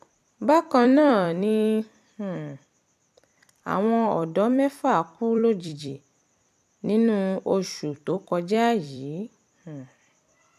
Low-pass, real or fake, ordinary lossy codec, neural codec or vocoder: 14.4 kHz; fake; AAC, 96 kbps; vocoder, 44.1 kHz, 128 mel bands every 256 samples, BigVGAN v2